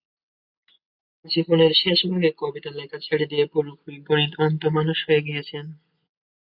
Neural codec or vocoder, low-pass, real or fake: none; 5.4 kHz; real